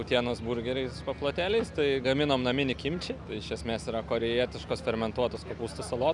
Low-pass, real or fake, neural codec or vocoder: 10.8 kHz; real; none